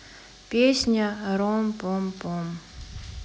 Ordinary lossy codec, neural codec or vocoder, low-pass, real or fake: none; none; none; real